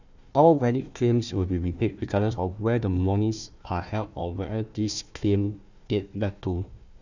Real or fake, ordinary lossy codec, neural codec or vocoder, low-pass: fake; none; codec, 16 kHz, 1 kbps, FunCodec, trained on Chinese and English, 50 frames a second; 7.2 kHz